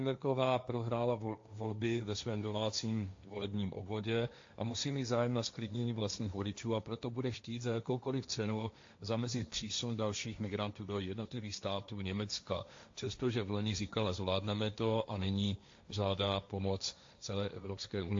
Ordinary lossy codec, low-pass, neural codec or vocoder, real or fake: AAC, 48 kbps; 7.2 kHz; codec, 16 kHz, 1.1 kbps, Voila-Tokenizer; fake